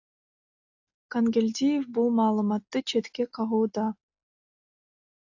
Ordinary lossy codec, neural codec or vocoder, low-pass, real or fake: AAC, 48 kbps; none; 7.2 kHz; real